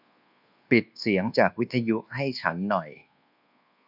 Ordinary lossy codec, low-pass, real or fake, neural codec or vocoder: none; 5.4 kHz; fake; codec, 24 kHz, 1.2 kbps, DualCodec